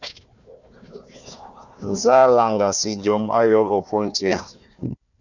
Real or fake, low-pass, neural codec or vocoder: fake; 7.2 kHz; codec, 16 kHz, 1 kbps, FunCodec, trained on Chinese and English, 50 frames a second